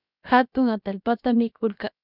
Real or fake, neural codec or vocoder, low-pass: fake; codec, 16 kHz, 0.7 kbps, FocalCodec; 5.4 kHz